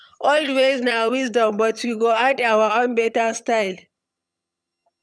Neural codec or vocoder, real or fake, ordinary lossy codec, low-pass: vocoder, 22.05 kHz, 80 mel bands, HiFi-GAN; fake; none; none